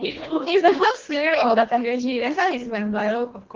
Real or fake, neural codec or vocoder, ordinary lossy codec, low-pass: fake; codec, 24 kHz, 1.5 kbps, HILCodec; Opus, 16 kbps; 7.2 kHz